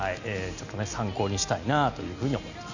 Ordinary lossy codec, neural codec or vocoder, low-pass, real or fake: none; none; 7.2 kHz; real